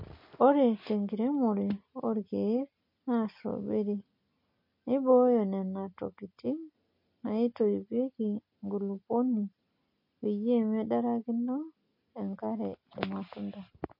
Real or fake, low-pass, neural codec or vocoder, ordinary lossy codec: real; 5.4 kHz; none; MP3, 32 kbps